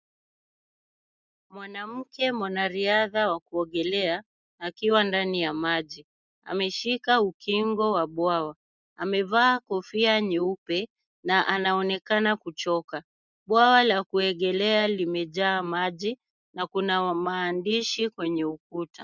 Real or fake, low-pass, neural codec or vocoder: real; 7.2 kHz; none